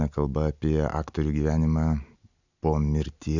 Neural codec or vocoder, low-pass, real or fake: none; 7.2 kHz; real